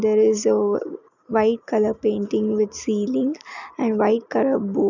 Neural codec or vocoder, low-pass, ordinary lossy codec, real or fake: none; 7.2 kHz; none; real